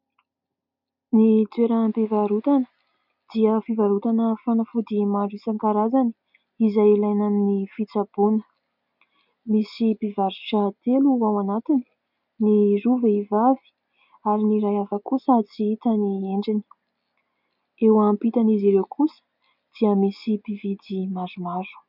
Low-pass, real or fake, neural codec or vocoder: 5.4 kHz; real; none